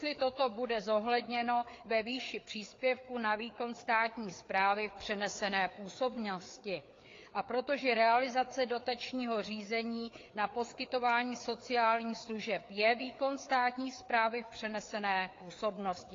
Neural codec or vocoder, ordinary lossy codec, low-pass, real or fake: codec, 16 kHz, 4 kbps, FreqCodec, larger model; AAC, 32 kbps; 7.2 kHz; fake